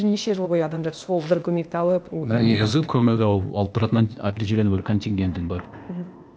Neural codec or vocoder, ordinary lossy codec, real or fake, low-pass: codec, 16 kHz, 0.8 kbps, ZipCodec; none; fake; none